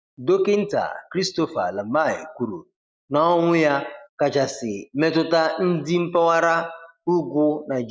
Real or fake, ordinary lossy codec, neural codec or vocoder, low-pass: real; none; none; none